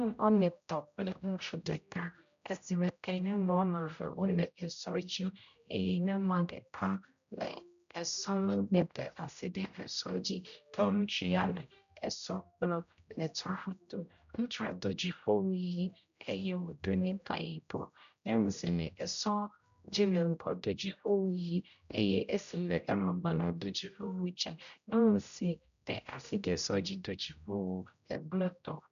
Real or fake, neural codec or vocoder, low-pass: fake; codec, 16 kHz, 0.5 kbps, X-Codec, HuBERT features, trained on general audio; 7.2 kHz